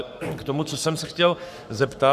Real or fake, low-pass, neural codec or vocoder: fake; 14.4 kHz; codec, 44.1 kHz, 7.8 kbps, Pupu-Codec